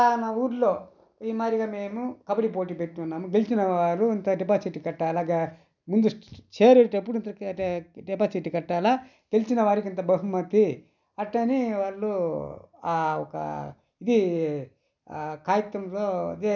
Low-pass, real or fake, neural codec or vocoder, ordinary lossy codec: 7.2 kHz; real; none; none